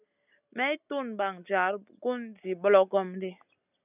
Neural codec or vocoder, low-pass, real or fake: none; 3.6 kHz; real